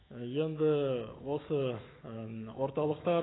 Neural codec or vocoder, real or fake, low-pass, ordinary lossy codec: autoencoder, 48 kHz, 128 numbers a frame, DAC-VAE, trained on Japanese speech; fake; 7.2 kHz; AAC, 16 kbps